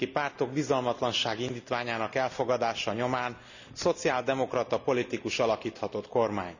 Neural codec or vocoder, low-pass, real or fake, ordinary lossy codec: none; 7.2 kHz; real; none